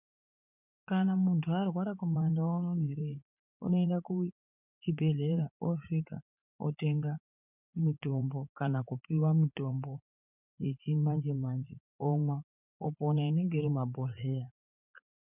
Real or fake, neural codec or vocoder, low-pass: fake; vocoder, 44.1 kHz, 80 mel bands, Vocos; 3.6 kHz